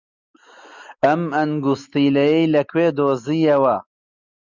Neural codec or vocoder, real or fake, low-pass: none; real; 7.2 kHz